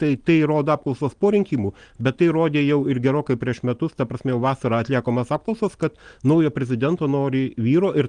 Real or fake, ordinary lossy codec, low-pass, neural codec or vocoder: real; Opus, 24 kbps; 9.9 kHz; none